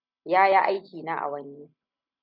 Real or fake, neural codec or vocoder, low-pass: real; none; 5.4 kHz